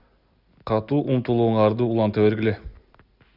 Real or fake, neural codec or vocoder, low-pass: real; none; 5.4 kHz